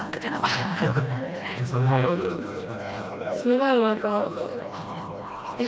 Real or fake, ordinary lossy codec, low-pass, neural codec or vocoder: fake; none; none; codec, 16 kHz, 1 kbps, FreqCodec, smaller model